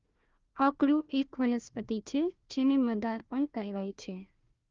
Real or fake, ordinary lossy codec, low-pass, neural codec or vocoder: fake; Opus, 32 kbps; 7.2 kHz; codec, 16 kHz, 1 kbps, FunCodec, trained on Chinese and English, 50 frames a second